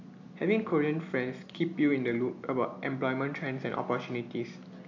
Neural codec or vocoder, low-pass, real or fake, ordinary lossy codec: none; 7.2 kHz; real; AAC, 48 kbps